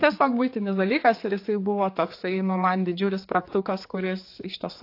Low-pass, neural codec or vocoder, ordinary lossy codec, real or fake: 5.4 kHz; codec, 16 kHz, 4 kbps, X-Codec, HuBERT features, trained on general audio; AAC, 32 kbps; fake